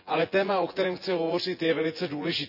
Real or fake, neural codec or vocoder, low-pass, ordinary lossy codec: fake; vocoder, 24 kHz, 100 mel bands, Vocos; 5.4 kHz; none